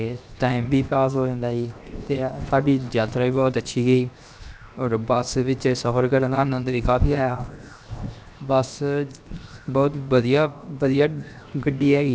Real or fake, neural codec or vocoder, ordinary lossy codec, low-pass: fake; codec, 16 kHz, 0.7 kbps, FocalCodec; none; none